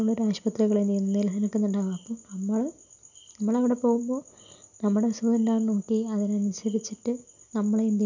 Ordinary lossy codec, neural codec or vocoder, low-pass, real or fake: none; none; 7.2 kHz; real